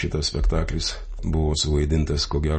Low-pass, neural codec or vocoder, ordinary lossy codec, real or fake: 10.8 kHz; none; MP3, 32 kbps; real